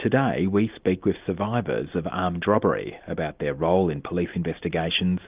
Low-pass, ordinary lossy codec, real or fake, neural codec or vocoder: 3.6 kHz; Opus, 24 kbps; real; none